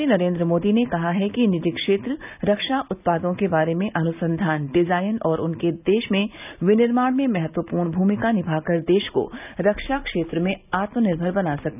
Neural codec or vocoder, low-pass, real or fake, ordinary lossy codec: none; 3.6 kHz; real; none